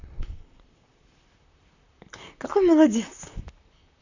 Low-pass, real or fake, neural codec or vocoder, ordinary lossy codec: 7.2 kHz; fake; vocoder, 44.1 kHz, 128 mel bands, Pupu-Vocoder; none